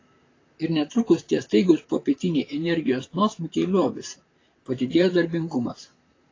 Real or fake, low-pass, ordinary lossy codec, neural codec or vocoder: fake; 7.2 kHz; AAC, 32 kbps; vocoder, 44.1 kHz, 128 mel bands, Pupu-Vocoder